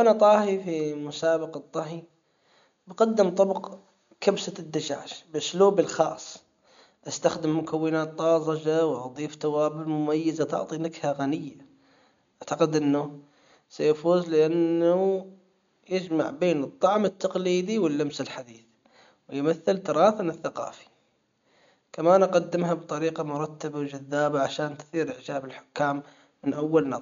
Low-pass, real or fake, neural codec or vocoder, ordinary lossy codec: 7.2 kHz; real; none; MP3, 64 kbps